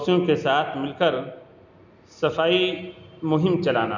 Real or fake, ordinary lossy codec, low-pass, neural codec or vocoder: real; none; 7.2 kHz; none